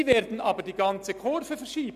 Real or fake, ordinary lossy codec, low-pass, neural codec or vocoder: real; none; 14.4 kHz; none